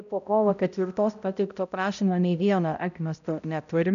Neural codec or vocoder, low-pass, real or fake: codec, 16 kHz, 0.5 kbps, X-Codec, HuBERT features, trained on balanced general audio; 7.2 kHz; fake